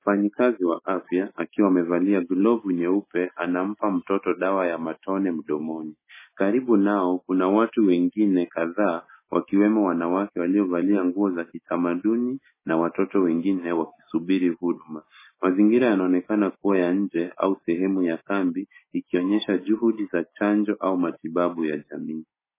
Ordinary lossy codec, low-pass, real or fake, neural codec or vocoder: MP3, 16 kbps; 3.6 kHz; real; none